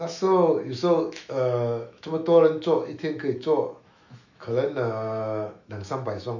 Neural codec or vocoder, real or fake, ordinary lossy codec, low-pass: autoencoder, 48 kHz, 128 numbers a frame, DAC-VAE, trained on Japanese speech; fake; none; 7.2 kHz